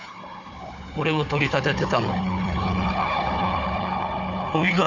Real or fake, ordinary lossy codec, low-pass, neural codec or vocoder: fake; none; 7.2 kHz; codec, 16 kHz, 4 kbps, FunCodec, trained on Chinese and English, 50 frames a second